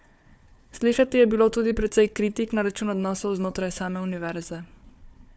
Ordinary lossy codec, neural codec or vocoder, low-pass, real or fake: none; codec, 16 kHz, 4 kbps, FunCodec, trained on Chinese and English, 50 frames a second; none; fake